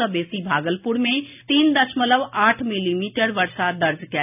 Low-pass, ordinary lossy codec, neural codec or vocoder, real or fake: 3.6 kHz; none; none; real